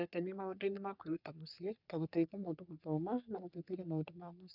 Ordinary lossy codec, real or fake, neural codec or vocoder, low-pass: none; fake; codec, 44.1 kHz, 3.4 kbps, Pupu-Codec; 5.4 kHz